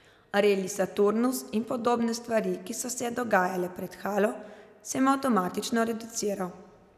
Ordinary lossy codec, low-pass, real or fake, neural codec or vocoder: none; 14.4 kHz; real; none